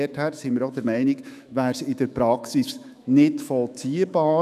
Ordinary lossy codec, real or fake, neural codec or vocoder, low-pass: none; fake; autoencoder, 48 kHz, 128 numbers a frame, DAC-VAE, trained on Japanese speech; 14.4 kHz